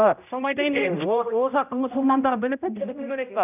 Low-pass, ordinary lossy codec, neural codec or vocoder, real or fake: 3.6 kHz; none; codec, 16 kHz, 0.5 kbps, X-Codec, HuBERT features, trained on general audio; fake